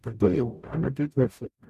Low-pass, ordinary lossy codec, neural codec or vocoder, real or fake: 14.4 kHz; MP3, 96 kbps; codec, 44.1 kHz, 0.9 kbps, DAC; fake